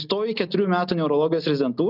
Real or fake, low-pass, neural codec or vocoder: real; 5.4 kHz; none